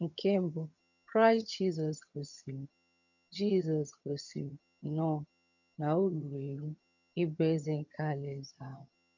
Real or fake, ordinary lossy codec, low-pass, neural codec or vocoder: fake; none; 7.2 kHz; vocoder, 22.05 kHz, 80 mel bands, HiFi-GAN